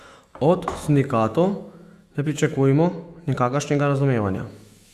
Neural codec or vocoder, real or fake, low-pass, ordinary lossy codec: autoencoder, 48 kHz, 128 numbers a frame, DAC-VAE, trained on Japanese speech; fake; 14.4 kHz; Opus, 64 kbps